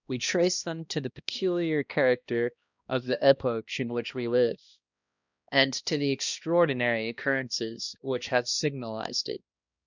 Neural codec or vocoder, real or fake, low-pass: codec, 16 kHz, 1 kbps, X-Codec, HuBERT features, trained on balanced general audio; fake; 7.2 kHz